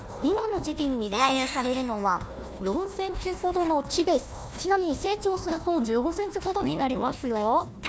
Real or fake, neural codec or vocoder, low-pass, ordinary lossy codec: fake; codec, 16 kHz, 1 kbps, FunCodec, trained on Chinese and English, 50 frames a second; none; none